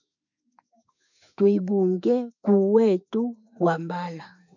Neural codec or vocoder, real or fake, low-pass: autoencoder, 48 kHz, 32 numbers a frame, DAC-VAE, trained on Japanese speech; fake; 7.2 kHz